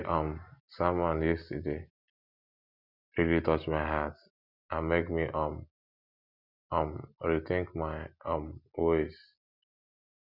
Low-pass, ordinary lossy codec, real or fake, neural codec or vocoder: 5.4 kHz; none; real; none